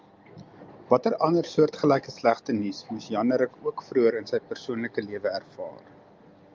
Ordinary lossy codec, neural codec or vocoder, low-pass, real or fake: Opus, 24 kbps; none; 7.2 kHz; real